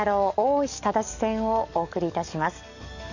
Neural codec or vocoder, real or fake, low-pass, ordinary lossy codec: codec, 44.1 kHz, 7.8 kbps, DAC; fake; 7.2 kHz; none